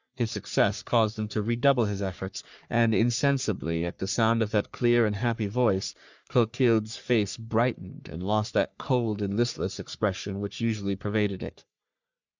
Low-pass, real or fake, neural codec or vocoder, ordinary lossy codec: 7.2 kHz; fake; codec, 44.1 kHz, 3.4 kbps, Pupu-Codec; Opus, 64 kbps